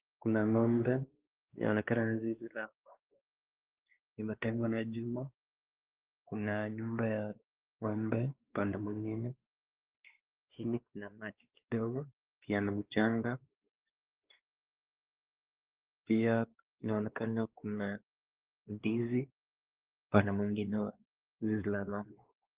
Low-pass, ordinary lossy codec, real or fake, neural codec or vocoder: 3.6 kHz; Opus, 16 kbps; fake; codec, 16 kHz, 2 kbps, X-Codec, WavLM features, trained on Multilingual LibriSpeech